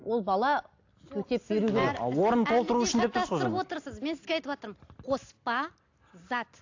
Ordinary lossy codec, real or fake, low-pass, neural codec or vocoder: AAC, 48 kbps; real; 7.2 kHz; none